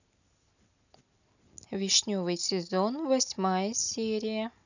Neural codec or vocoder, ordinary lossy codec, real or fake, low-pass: none; none; real; 7.2 kHz